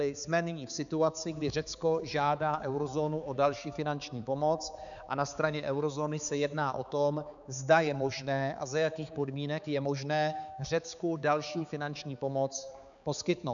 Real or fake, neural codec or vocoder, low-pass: fake; codec, 16 kHz, 4 kbps, X-Codec, HuBERT features, trained on balanced general audio; 7.2 kHz